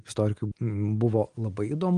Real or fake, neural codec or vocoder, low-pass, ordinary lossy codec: real; none; 9.9 kHz; Opus, 24 kbps